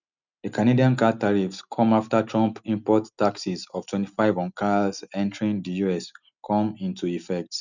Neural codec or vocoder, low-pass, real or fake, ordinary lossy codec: none; 7.2 kHz; real; none